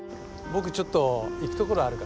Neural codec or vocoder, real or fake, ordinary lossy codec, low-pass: none; real; none; none